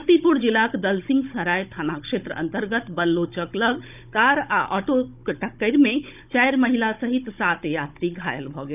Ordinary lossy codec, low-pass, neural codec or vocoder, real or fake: none; 3.6 kHz; codec, 16 kHz, 16 kbps, FunCodec, trained on Chinese and English, 50 frames a second; fake